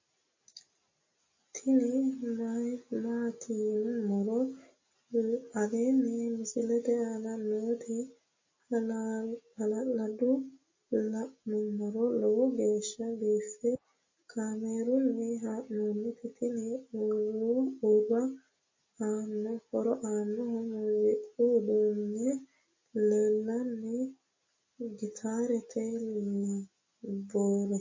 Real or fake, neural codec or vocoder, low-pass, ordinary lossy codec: real; none; 7.2 kHz; MP3, 32 kbps